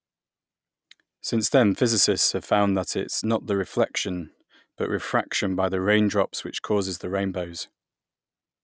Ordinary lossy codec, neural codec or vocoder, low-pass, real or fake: none; none; none; real